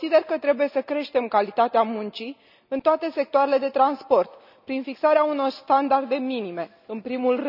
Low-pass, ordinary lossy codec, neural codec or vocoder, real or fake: 5.4 kHz; none; none; real